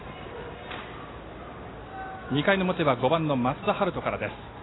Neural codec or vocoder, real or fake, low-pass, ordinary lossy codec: none; real; 7.2 kHz; AAC, 16 kbps